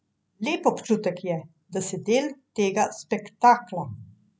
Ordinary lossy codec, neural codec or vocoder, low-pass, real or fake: none; none; none; real